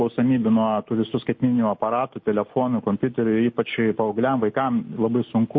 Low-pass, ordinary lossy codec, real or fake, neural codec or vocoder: 7.2 kHz; MP3, 32 kbps; real; none